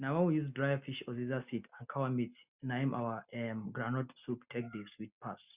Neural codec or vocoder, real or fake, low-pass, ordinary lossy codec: none; real; 3.6 kHz; Opus, 64 kbps